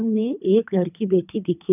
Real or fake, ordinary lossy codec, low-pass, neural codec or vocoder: fake; none; 3.6 kHz; codec, 24 kHz, 3 kbps, HILCodec